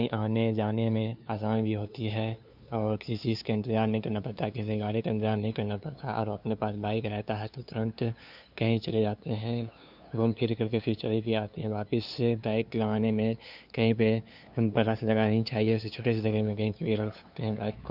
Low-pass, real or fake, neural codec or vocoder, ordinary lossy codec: 5.4 kHz; fake; codec, 16 kHz, 2 kbps, FunCodec, trained on LibriTTS, 25 frames a second; none